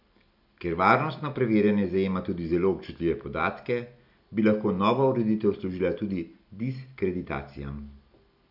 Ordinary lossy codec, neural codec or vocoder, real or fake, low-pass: none; none; real; 5.4 kHz